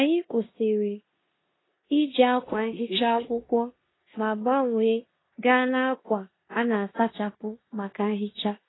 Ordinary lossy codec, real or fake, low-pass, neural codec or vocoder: AAC, 16 kbps; fake; 7.2 kHz; codec, 16 kHz in and 24 kHz out, 0.9 kbps, LongCat-Audio-Codec, four codebook decoder